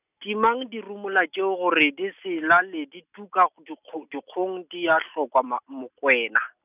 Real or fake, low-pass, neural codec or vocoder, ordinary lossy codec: real; 3.6 kHz; none; none